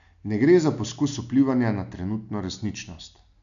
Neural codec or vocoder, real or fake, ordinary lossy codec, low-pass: none; real; none; 7.2 kHz